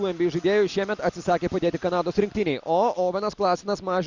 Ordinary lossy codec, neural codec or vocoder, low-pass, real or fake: Opus, 64 kbps; none; 7.2 kHz; real